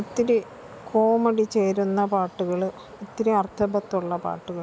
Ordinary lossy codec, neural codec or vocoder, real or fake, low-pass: none; none; real; none